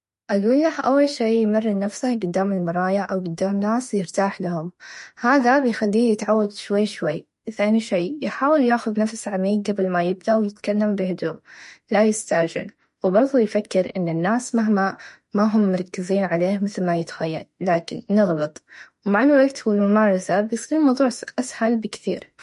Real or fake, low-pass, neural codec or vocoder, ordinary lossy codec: fake; 14.4 kHz; autoencoder, 48 kHz, 32 numbers a frame, DAC-VAE, trained on Japanese speech; MP3, 48 kbps